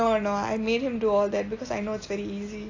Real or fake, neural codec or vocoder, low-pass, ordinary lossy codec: real; none; 7.2 kHz; AAC, 32 kbps